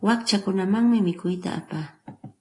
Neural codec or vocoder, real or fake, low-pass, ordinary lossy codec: none; real; 10.8 kHz; AAC, 32 kbps